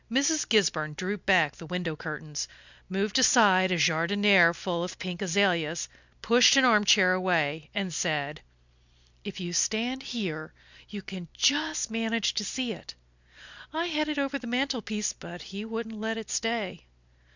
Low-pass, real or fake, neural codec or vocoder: 7.2 kHz; real; none